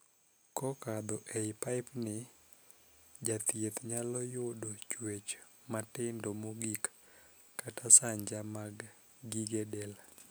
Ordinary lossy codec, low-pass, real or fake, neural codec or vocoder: none; none; real; none